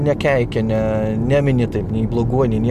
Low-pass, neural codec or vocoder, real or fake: 14.4 kHz; none; real